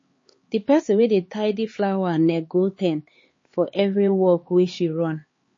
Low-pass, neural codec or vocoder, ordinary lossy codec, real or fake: 7.2 kHz; codec, 16 kHz, 4 kbps, X-Codec, HuBERT features, trained on LibriSpeech; MP3, 32 kbps; fake